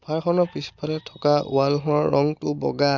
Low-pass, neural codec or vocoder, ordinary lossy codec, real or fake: 7.2 kHz; none; none; real